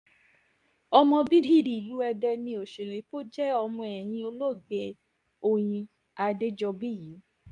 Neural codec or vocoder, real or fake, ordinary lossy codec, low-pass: codec, 24 kHz, 0.9 kbps, WavTokenizer, medium speech release version 2; fake; MP3, 96 kbps; 10.8 kHz